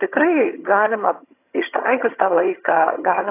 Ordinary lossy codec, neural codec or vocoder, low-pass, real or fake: AAC, 24 kbps; vocoder, 22.05 kHz, 80 mel bands, HiFi-GAN; 3.6 kHz; fake